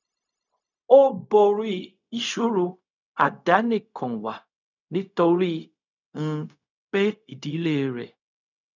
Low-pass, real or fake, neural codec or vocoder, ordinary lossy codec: 7.2 kHz; fake; codec, 16 kHz, 0.4 kbps, LongCat-Audio-Codec; none